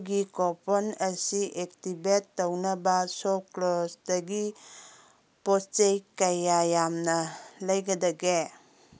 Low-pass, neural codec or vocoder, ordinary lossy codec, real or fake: none; none; none; real